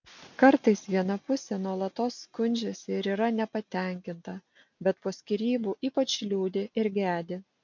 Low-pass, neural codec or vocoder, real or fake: 7.2 kHz; none; real